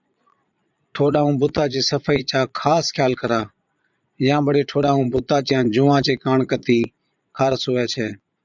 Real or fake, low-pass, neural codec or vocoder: fake; 7.2 kHz; vocoder, 22.05 kHz, 80 mel bands, Vocos